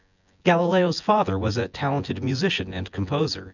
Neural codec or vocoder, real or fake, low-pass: vocoder, 24 kHz, 100 mel bands, Vocos; fake; 7.2 kHz